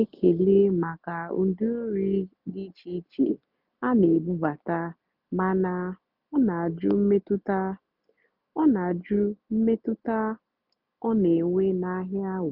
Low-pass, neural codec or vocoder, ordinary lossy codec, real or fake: 5.4 kHz; none; none; real